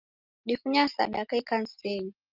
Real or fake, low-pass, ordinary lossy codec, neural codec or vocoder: real; 5.4 kHz; Opus, 24 kbps; none